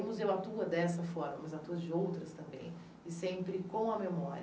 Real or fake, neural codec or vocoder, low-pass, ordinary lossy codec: real; none; none; none